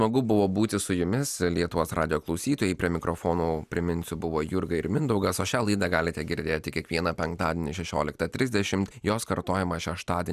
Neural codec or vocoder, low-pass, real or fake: none; 14.4 kHz; real